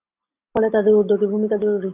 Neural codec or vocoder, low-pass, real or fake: none; 3.6 kHz; real